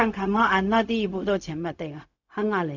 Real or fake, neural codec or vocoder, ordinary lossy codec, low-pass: fake; codec, 16 kHz, 0.4 kbps, LongCat-Audio-Codec; none; 7.2 kHz